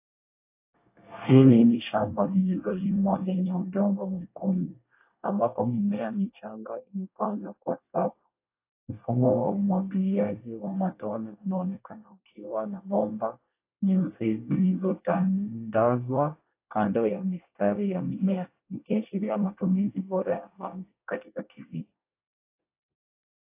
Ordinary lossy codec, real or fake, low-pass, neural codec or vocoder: AAC, 24 kbps; fake; 3.6 kHz; codec, 24 kHz, 1 kbps, SNAC